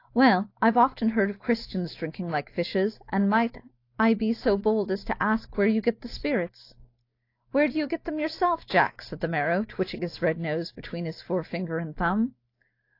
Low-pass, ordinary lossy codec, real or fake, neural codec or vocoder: 5.4 kHz; AAC, 32 kbps; real; none